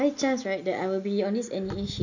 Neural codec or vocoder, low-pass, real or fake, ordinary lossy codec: none; 7.2 kHz; real; none